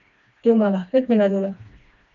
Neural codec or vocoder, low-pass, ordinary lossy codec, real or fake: codec, 16 kHz, 2 kbps, FreqCodec, smaller model; 7.2 kHz; AAC, 64 kbps; fake